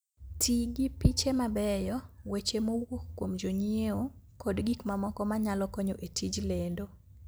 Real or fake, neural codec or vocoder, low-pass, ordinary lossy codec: real; none; none; none